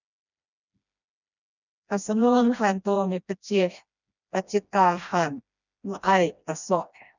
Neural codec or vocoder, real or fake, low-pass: codec, 16 kHz, 1 kbps, FreqCodec, smaller model; fake; 7.2 kHz